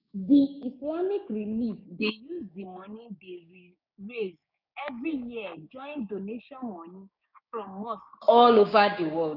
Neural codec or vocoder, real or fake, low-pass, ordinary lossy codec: codec, 16 kHz, 6 kbps, DAC; fake; 5.4 kHz; none